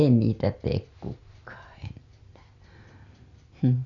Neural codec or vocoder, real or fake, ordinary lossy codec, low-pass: none; real; none; 7.2 kHz